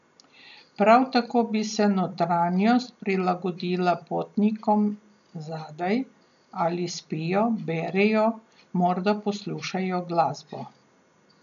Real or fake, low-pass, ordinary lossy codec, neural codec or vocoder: real; 7.2 kHz; none; none